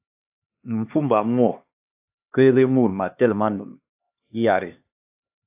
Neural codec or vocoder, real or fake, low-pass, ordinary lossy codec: codec, 16 kHz, 2 kbps, X-Codec, HuBERT features, trained on LibriSpeech; fake; 3.6 kHz; AAC, 32 kbps